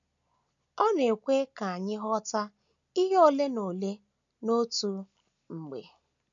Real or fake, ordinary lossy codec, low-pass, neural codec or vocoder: real; none; 7.2 kHz; none